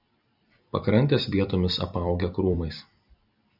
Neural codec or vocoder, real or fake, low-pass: vocoder, 44.1 kHz, 128 mel bands every 256 samples, BigVGAN v2; fake; 5.4 kHz